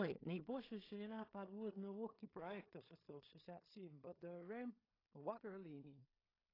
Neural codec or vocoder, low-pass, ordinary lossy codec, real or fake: codec, 16 kHz in and 24 kHz out, 0.4 kbps, LongCat-Audio-Codec, two codebook decoder; 5.4 kHz; AAC, 24 kbps; fake